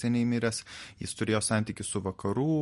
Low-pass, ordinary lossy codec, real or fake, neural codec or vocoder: 14.4 kHz; MP3, 48 kbps; real; none